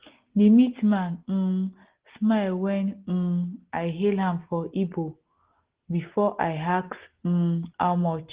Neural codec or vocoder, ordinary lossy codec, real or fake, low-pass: none; Opus, 16 kbps; real; 3.6 kHz